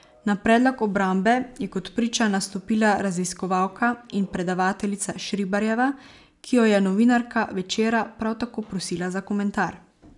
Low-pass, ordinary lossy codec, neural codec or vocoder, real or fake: 10.8 kHz; none; none; real